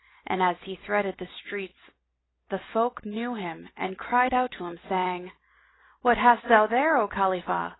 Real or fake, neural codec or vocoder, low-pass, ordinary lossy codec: real; none; 7.2 kHz; AAC, 16 kbps